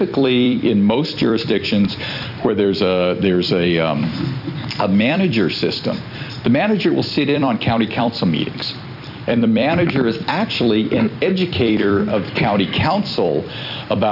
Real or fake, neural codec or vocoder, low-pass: fake; vocoder, 44.1 kHz, 128 mel bands every 256 samples, BigVGAN v2; 5.4 kHz